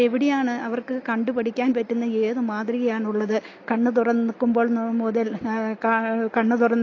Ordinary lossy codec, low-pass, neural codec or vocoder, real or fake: AAC, 32 kbps; 7.2 kHz; none; real